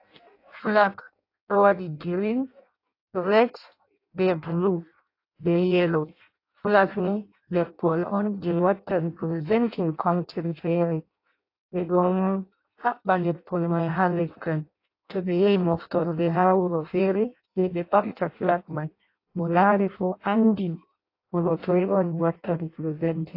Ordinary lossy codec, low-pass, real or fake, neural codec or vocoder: AAC, 32 kbps; 5.4 kHz; fake; codec, 16 kHz in and 24 kHz out, 0.6 kbps, FireRedTTS-2 codec